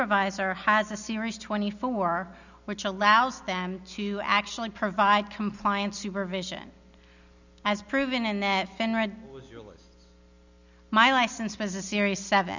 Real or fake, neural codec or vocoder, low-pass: real; none; 7.2 kHz